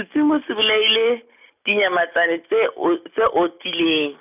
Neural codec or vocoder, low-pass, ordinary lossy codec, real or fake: none; 3.6 kHz; none; real